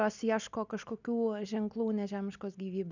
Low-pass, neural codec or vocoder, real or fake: 7.2 kHz; none; real